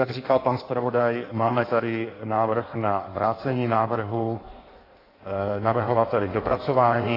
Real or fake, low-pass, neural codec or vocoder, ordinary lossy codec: fake; 5.4 kHz; codec, 16 kHz in and 24 kHz out, 1.1 kbps, FireRedTTS-2 codec; AAC, 24 kbps